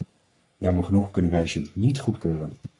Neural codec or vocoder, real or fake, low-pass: codec, 44.1 kHz, 3.4 kbps, Pupu-Codec; fake; 10.8 kHz